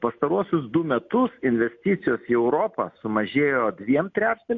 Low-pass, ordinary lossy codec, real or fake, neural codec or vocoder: 7.2 kHz; MP3, 48 kbps; real; none